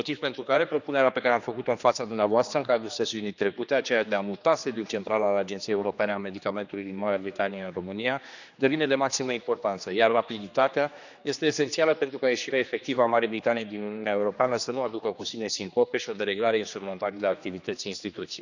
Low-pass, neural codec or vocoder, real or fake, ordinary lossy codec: 7.2 kHz; codec, 16 kHz, 2 kbps, X-Codec, HuBERT features, trained on general audio; fake; none